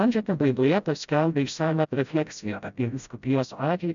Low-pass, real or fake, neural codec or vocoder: 7.2 kHz; fake; codec, 16 kHz, 0.5 kbps, FreqCodec, smaller model